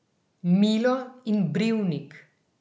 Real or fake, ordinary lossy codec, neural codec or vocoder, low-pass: real; none; none; none